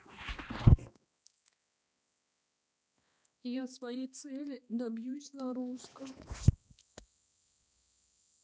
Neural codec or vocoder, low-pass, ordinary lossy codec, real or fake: codec, 16 kHz, 2 kbps, X-Codec, HuBERT features, trained on balanced general audio; none; none; fake